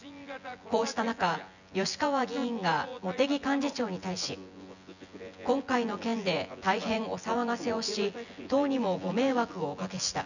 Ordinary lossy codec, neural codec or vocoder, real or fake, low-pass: none; vocoder, 24 kHz, 100 mel bands, Vocos; fake; 7.2 kHz